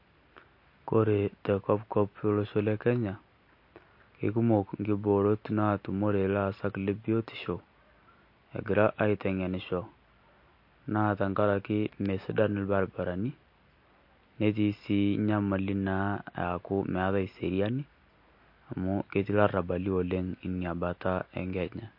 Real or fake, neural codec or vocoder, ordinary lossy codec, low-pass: real; none; MP3, 32 kbps; 5.4 kHz